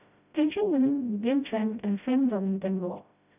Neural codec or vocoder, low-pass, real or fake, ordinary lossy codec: codec, 16 kHz, 0.5 kbps, FreqCodec, smaller model; 3.6 kHz; fake; none